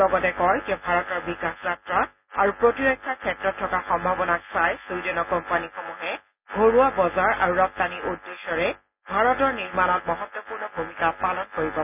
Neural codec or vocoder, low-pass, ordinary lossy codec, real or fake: none; 3.6 kHz; none; real